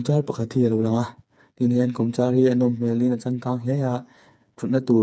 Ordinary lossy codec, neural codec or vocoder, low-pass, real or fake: none; codec, 16 kHz, 4 kbps, FreqCodec, smaller model; none; fake